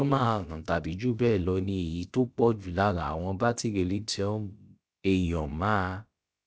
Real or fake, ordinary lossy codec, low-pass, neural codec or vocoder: fake; none; none; codec, 16 kHz, about 1 kbps, DyCAST, with the encoder's durations